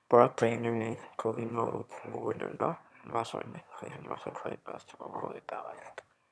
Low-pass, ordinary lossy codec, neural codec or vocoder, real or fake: none; none; autoencoder, 22.05 kHz, a latent of 192 numbers a frame, VITS, trained on one speaker; fake